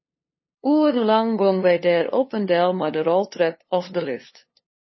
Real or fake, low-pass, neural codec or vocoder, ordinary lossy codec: fake; 7.2 kHz; codec, 16 kHz, 2 kbps, FunCodec, trained on LibriTTS, 25 frames a second; MP3, 24 kbps